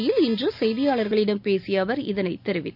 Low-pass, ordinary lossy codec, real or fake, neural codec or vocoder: 5.4 kHz; none; real; none